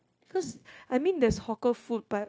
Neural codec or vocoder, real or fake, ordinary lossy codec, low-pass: codec, 16 kHz, 0.9 kbps, LongCat-Audio-Codec; fake; none; none